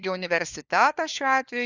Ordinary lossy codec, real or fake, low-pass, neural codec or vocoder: Opus, 64 kbps; fake; 7.2 kHz; codec, 16 kHz, 4 kbps, FunCodec, trained on LibriTTS, 50 frames a second